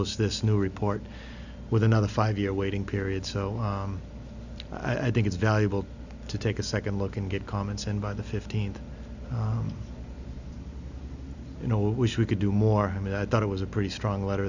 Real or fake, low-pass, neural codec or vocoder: real; 7.2 kHz; none